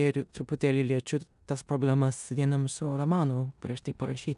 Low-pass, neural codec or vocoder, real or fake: 10.8 kHz; codec, 16 kHz in and 24 kHz out, 0.9 kbps, LongCat-Audio-Codec, four codebook decoder; fake